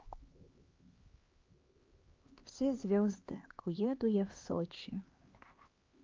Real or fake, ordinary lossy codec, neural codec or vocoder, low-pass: fake; Opus, 24 kbps; codec, 16 kHz, 2 kbps, X-Codec, HuBERT features, trained on LibriSpeech; 7.2 kHz